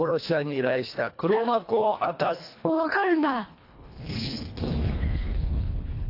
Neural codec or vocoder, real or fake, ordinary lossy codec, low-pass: codec, 24 kHz, 1.5 kbps, HILCodec; fake; AAC, 32 kbps; 5.4 kHz